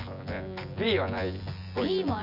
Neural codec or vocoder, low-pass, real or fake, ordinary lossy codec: vocoder, 24 kHz, 100 mel bands, Vocos; 5.4 kHz; fake; none